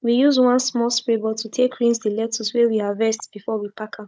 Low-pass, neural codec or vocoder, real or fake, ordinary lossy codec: none; codec, 16 kHz, 16 kbps, FunCodec, trained on Chinese and English, 50 frames a second; fake; none